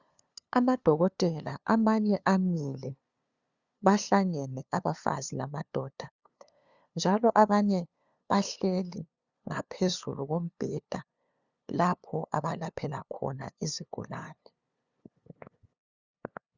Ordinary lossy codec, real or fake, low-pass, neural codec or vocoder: Opus, 64 kbps; fake; 7.2 kHz; codec, 16 kHz, 2 kbps, FunCodec, trained on LibriTTS, 25 frames a second